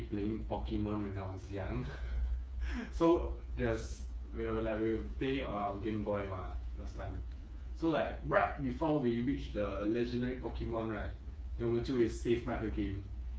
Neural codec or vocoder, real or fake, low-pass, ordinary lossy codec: codec, 16 kHz, 4 kbps, FreqCodec, smaller model; fake; none; none